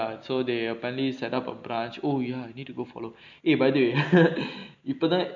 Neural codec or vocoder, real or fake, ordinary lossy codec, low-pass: none; real; none; 7.2 kHz